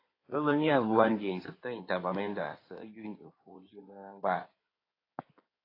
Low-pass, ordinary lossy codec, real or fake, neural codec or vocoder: 5.4 kHz; AAC, 24 kbps; fake; codec, 16 kHz in and 24 kHz out, 2.2 kbps, FireRedTTS-2 codec